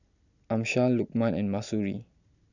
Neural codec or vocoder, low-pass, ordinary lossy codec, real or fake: none; 7.2 kHz; none; real